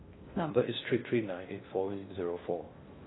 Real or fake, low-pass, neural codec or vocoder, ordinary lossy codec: fake; 7.2 kHz; codec, 16 kHz in and 24 kHz out, 0.6 kbps, FocalCodec, streaming, 2048 codes; AAC, 16 kbps